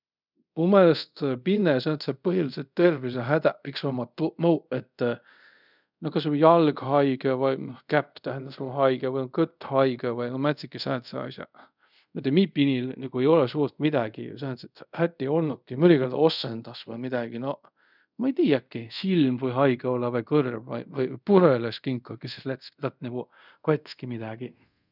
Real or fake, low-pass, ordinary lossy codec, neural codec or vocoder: fake; 5.4 kHz; none; codec, 24 kHz, 0.5 kbps, DualCodec